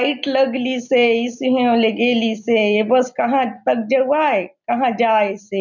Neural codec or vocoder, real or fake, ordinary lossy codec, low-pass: none; real; none; 7.2 kHz